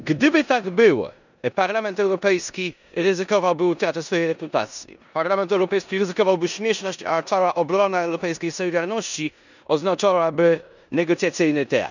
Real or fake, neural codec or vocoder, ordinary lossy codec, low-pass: fake; codec, 16 kHz in and 24 kHz out, 0.9 kbps, LongCat-Audio-Codec, four codebook decoder; none; 7.2 kHz